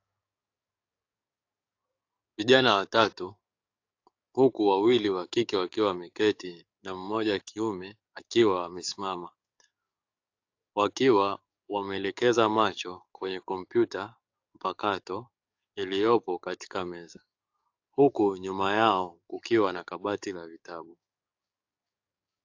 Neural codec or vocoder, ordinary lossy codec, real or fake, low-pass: codec, 44.1 kHz, 7.8 kbps, DAC; AAC, 48 kbps; fake; 7.2 kHz